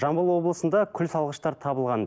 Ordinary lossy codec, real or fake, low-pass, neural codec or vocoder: none; real; none; none